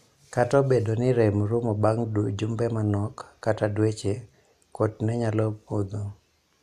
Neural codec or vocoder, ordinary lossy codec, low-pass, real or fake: none; none; 14.4 kHz; real